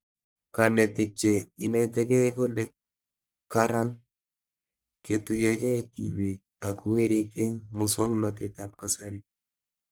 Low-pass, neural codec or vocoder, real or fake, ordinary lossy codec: none; codec, 44.1 kHz, 1.7 kbps, Pupu-Codec; fake; none